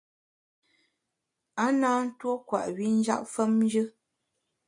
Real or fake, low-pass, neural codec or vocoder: real; 10.8 kHz; none